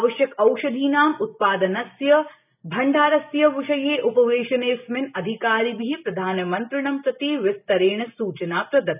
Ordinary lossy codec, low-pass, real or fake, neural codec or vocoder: none; 3.6 kHz; real; none